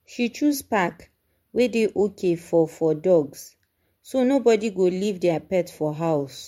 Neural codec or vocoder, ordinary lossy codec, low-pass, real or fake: vocoder, 44.1 kHz, 128 mel bands every 512 samples, BigVGAN v2; MP3, 64 kbps; 19.8 kHz; fake